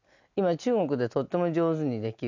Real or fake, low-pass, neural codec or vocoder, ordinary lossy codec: real; 7.2 kHz; none; none